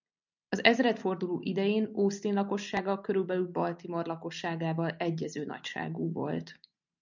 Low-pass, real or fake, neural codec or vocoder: 7.2 kHz; real; none